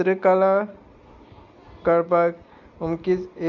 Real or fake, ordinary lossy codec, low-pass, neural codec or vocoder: real; none; 7.2 kHz; none